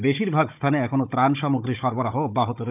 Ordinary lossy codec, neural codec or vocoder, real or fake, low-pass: none; codec, 16 kHz, 16 kbps, FunCodec, trained on Chinese and English, 50 frames a second; fake; 3.6 kHz